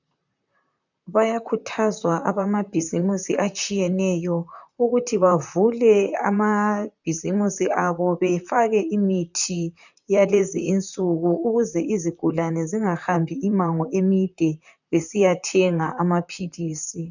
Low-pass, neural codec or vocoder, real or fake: 7.2 kHz; vocoder, 44.1 kHz, 128 mel bands, Pupu-Vocoder; fake